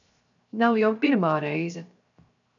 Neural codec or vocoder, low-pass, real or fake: codec, 16 kHz, 0.7 kbps, FocalCodec; 7.2 kHz; fake